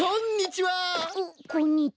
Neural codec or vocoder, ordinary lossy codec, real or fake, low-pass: none; none; real; none